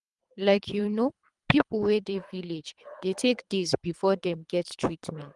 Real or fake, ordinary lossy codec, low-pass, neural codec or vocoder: fake; Opus, 32 kbps; 10.8 kHz; codec, 24 kHz, 3 kbps, HILCodec